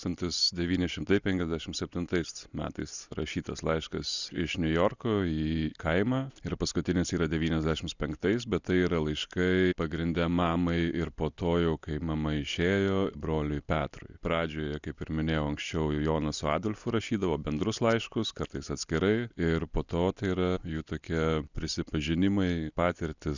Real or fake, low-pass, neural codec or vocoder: real; 7.2 kHz; none